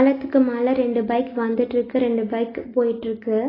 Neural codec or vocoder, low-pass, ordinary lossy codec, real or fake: none; 5.4 kHz; AAC, 24 kbps; real